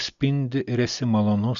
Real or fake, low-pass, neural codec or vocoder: real; 7.2 kHz; none